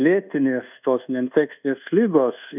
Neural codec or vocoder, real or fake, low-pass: codec, 24 kHz, 1.2 kbps, DualCodec; fake; 3.6 kHz